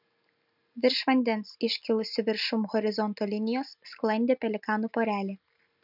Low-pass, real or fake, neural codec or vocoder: 5.4 kHz; real; none